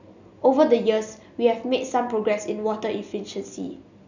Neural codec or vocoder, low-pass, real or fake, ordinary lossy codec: none; 7.2 kHz; real; none